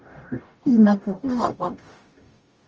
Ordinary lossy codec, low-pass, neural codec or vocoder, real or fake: Opus, 24 kbps; 7.2 kHz; codec, 44.1 kHz, 0.9 kbps, DAC; fake